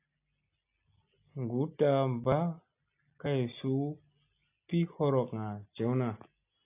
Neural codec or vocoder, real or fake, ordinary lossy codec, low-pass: none; real; AAC, 24 kbps; 3.6 kHz